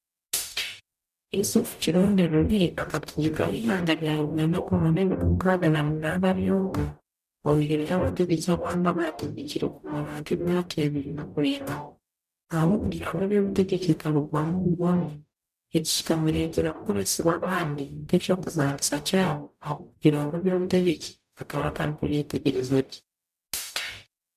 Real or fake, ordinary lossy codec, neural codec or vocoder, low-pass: fake; none; codec, 44.1 kHz, 0.9 kbps, DAC; 14.4 kHz